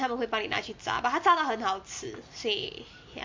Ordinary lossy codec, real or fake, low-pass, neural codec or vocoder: MP3, 48 kbps; real; 7.2 kHz; none